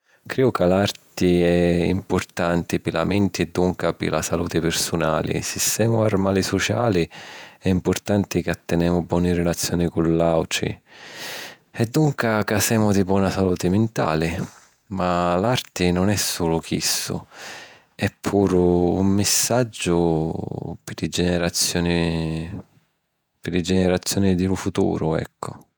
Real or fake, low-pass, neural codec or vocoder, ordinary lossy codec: fake; none; vocoder, 48 kHz, 128 mel bands, Vocos; none